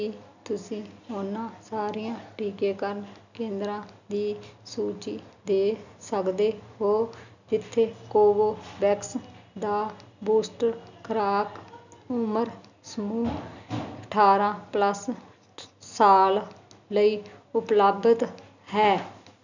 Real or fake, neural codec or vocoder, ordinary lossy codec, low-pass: real; none; none; 7.2 kHz